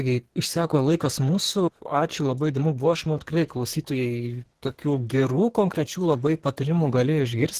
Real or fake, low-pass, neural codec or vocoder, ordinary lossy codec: fake; 14.4 kHz; codec, 44.1 kHz, 2.6 kbps, SNAC; Opus, 16 kbps